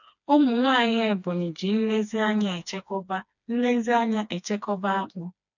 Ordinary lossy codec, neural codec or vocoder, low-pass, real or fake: none; codec, 16 kHz, 2 kbps, FreqCodec, smaller model; 7.2 kHz; fake